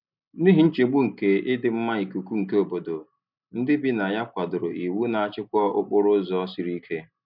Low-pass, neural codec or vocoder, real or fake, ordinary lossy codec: 5.4 kHz; none; real; none